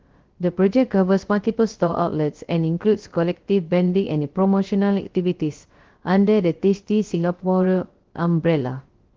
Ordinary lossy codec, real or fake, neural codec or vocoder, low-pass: Opus, 16 kbps; fake; codec, 16 kHz, 0.3 kbps, FocalCodec; 7.2 kHz